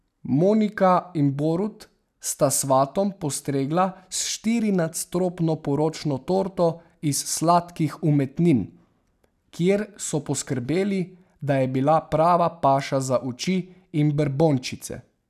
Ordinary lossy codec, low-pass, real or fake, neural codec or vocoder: none; 14.4 kHz; real; none